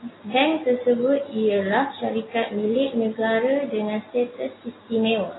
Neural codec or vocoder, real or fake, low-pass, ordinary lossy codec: none; real; 7.2 kHz; AAC, 16 kbps